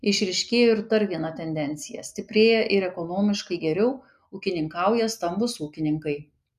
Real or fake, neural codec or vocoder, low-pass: real; none; 14.4 kHz